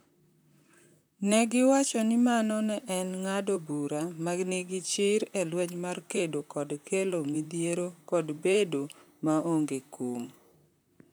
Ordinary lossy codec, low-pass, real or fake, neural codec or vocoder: none; none; fake; vocoder, 44.1 kHz, 128 mel bands, Pupu-Vocoder